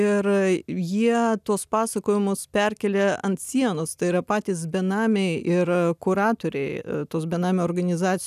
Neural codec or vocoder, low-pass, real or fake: none; 14.4 kHz; real